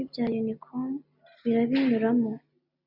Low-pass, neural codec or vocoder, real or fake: 5.4 kHz; none; real